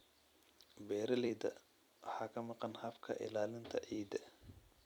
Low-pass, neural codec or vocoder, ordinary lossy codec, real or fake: none; vocoder, 44.1 kHz, 128 mel bands every 256 samples, BigVGAN v2; none; fake